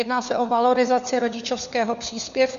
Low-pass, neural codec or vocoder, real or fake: 7.2 kHz; codec, 16 kHz, 4 kbps, FunCodec, trained on LibriTTS, 50 frames a second; fake